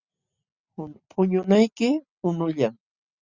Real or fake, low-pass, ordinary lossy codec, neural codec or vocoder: real; 7.2 kHz; Opus, 64 kbps; none